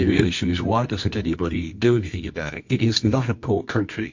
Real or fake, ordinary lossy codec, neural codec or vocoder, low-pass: fake; MP3, 48 kbps; codec, 24 kHz, 0.9 kbps, WavTokenizer, medium music audio release; 7.2 kHz